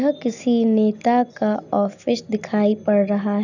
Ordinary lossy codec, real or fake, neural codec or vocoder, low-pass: none; real; none; 7.2 kHz